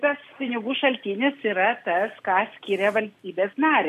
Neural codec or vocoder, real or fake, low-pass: none; real; 14.4 kHz